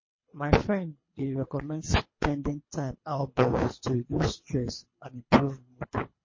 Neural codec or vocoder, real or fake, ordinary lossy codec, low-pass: codec, 24 kHz, 3 kbps, HILCodec; fake; MP3, 32 kbps; 7.2 kHz